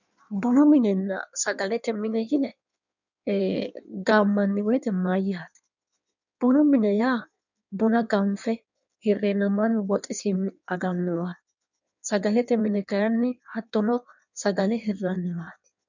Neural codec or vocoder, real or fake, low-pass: codec, 16 kHz in and 24 kHz out, 1.1 kbps, FireRedTTS-2 codec; fake; 7.2 kHz